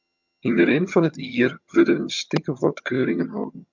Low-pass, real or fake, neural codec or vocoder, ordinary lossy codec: 7.2 kHz; fake; vocoder, 22.05 kHz, 80 mel bands, HiFi-GAN; MP3, 64 kbps